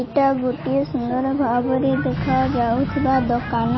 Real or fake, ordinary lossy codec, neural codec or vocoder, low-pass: real; MP3, 24 kbps; none; 7.2 kHz